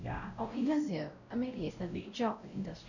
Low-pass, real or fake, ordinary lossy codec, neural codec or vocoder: 7.2 kHz; fake; none; codec, 16 kHz, 0.5 kbps, X-Codec, WavLM features, trained on Multilingual LibriSpeech